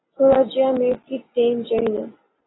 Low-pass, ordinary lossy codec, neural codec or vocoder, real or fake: 7.2 kHz; AAC, 16 kbps; none; real